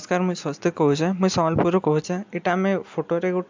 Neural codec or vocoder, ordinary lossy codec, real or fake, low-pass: none; MP3, 64 kbps; real; 7.2 kHz